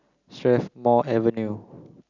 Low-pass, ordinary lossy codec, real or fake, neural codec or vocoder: 7.2 kHz; Opus, 64 kbps; real; none